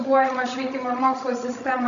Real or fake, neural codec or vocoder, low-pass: fake; codec, 16 kHz, 16 kbps, FreqCodec, larger model; 7.2 kHz